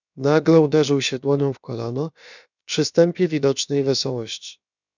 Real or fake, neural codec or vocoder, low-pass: fake; codec, 16 kHz, about 1 kbps, DyCAST, with the encoder's durations; 7.2 kHz